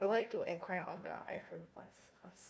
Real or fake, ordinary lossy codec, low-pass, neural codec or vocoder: fake; none; none; codec, 16 kHz, 1 kbps, FunCodec, trained on Chinese and English, 50 frames a second